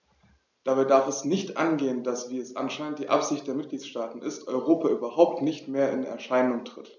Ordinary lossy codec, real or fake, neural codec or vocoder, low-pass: none; real; none; 7.2 kHz